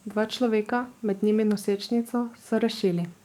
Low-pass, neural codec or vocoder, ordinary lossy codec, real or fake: 19.8 kHz; codec, 44.1 kHz, 7.8 kbps, DAC; none; fake